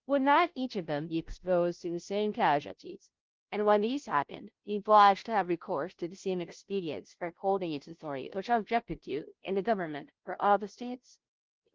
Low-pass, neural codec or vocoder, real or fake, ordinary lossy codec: 7.2 kHz; codec, 16 kHz, 0.5 kbps, FunCodec, trained on Chinese and English, 25 frames a second; fake; Opus, 16 kbps